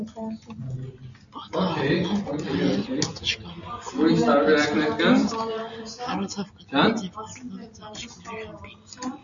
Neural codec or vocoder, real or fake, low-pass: none; real; 7.2 kHz